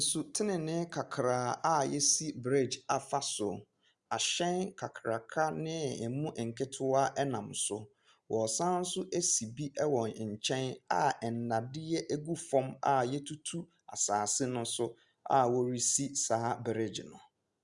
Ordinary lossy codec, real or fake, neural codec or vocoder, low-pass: Opus, 64 kbps; real; none; 10.8 kHz